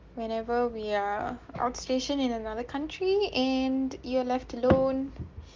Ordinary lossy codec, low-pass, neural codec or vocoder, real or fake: Opus, 32 kbps; 7.2 kHz; none; real